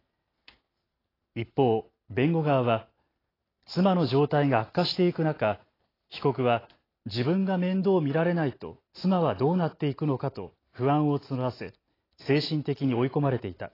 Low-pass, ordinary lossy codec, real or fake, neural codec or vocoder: 5.4 kHz; AAC, 24 kbps; real; none